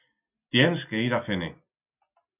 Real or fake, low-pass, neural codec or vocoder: real; 3.6 kHz; none